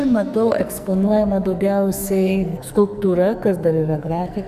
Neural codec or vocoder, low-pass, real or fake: codec, 44.1 kHz, 2.6 kbps, SNAC; 14.4 kHz; fake